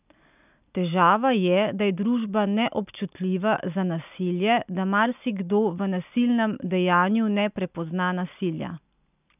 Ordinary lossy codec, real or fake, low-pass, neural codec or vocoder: none; real; 3.6 kHz; none